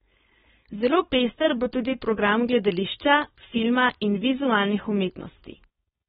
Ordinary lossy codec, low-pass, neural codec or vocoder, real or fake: AAC, 16 kbps; 7.2 kHz; codec, 16 kHz, 4.8 kbps, FACodec; fake